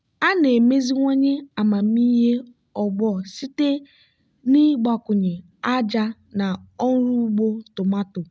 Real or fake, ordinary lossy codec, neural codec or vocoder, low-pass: real; none; none; none